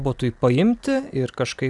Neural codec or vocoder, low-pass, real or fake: none; 10.8 kHz; real